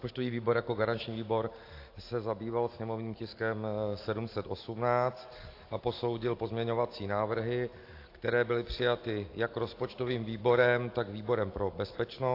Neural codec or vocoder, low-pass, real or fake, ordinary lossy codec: none; 5.4 kHz; real; AAC, 32 kbps